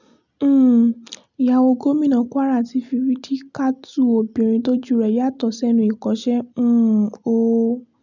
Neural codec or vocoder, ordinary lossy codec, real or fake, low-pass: none; none; real; 7.2 kHz